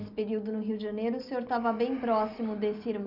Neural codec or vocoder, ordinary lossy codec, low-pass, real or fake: none; none; 5.4 kHz; real